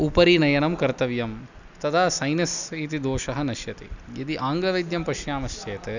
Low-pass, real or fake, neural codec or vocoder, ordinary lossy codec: 7.2 kHz; real; none; none